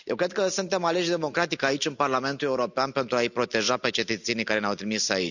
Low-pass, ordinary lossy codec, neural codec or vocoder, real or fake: 7.2 kHz; none; none; real